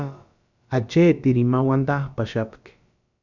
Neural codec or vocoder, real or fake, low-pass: codec, 16 kHz, about 1 kbps, DyCAST, with the encoder's durations; fake; 7.2 kHz